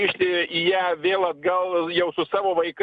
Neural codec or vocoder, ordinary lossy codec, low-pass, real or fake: none; Opus, 64 kbps; 10.8 kHz; real